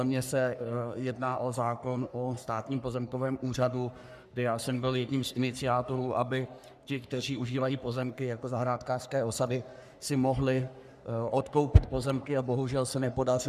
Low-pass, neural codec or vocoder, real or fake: 14.4 kHz; codec, 44.1 kHz, 3.4 kbps, Pupu-Codec; fake